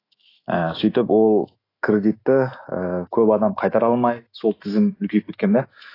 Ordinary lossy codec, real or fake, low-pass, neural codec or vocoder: AAC, 24 kbps; fake; 5.4 kHz; autoencoder, 48 kHz, 128 numbers a frame, DAC-VAE, trained on Japanese speech